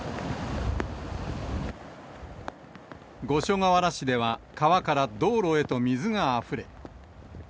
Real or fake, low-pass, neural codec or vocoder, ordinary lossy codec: real; none; none; none